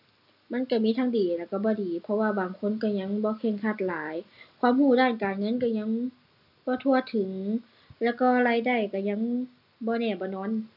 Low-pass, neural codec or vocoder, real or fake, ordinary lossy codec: 5.4 kHz; none; real; none